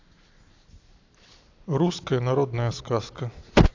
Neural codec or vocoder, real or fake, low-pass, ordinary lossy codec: none; real; 7.2 kHz; AAC, 48 kbps